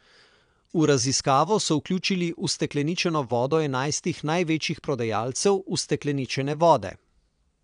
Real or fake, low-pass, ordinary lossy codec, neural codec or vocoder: fake; 9.9 kHz; none; vocoder, 22.05 kHz, 80 mel bands, Vocos